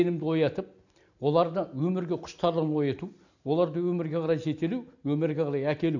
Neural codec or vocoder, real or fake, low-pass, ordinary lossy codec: none; real; 7.2 kHz; none